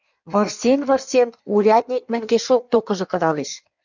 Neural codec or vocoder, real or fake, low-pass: codec, 16 kHz in and 24 kHz out, 1.1 kbps, FireRedTTS-2 codec; fake; 7.2 kHz